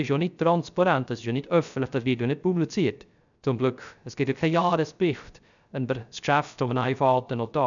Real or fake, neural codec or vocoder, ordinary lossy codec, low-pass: fake; codec, 16 kHz, 0.3 kbps, FocalCodec; none; 7.2 kHz